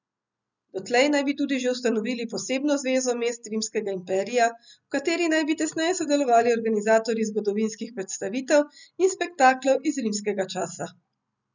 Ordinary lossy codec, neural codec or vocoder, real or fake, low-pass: none; none; real; 7.2 kHz